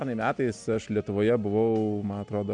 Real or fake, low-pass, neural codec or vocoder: real; 9.9 kHz; none